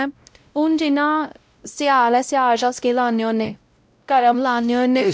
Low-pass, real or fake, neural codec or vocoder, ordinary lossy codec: none; fake; codec, 16 kHz, 0.5 kbps, X-Codec, WavLM features, trained on Multilingual LibriSpeech; none